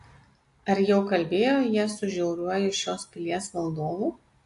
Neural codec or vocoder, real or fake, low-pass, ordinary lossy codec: none; real; 10.8 kHz; AAC, 48 kbps